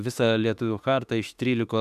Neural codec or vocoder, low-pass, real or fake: autoencoder, 48 kHz, 32 numbers a frame, DAC-VAE, trained on Japanese speech; 14.4 kHz; fake